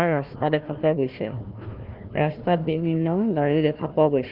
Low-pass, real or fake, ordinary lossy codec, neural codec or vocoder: 5.4 kHz; fake; Opus, 32 kbps; codec, 16 kHz, 1 kbps, FunCodec, trained on Chinese and English, 50 frames a second